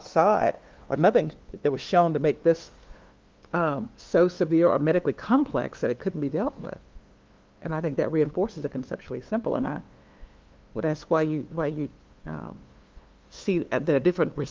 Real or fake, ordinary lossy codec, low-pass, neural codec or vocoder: fake; Opus, 32 kbps; 7.2 kHz; codec, 16 kHz, 2 kbps, FunCodec, trained on LibriTTS, 25 frames a second